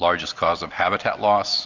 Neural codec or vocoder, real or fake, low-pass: none; real; 7.2 kHz